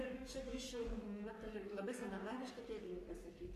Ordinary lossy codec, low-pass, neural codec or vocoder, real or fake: AAC, 96 kbps; 14.4 kHz; codec, 44.1 kHz, 3.4 kbps, Pupu-Codec; fake